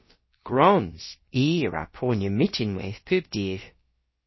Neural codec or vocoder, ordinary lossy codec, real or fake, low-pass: codec, 16 kHz, about 1 kbps, DyCAST, with the encoder's durations; MP3, 24 kbps; fake; 7.2 kHz